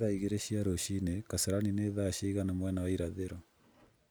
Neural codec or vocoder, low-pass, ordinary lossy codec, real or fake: none; none; none; real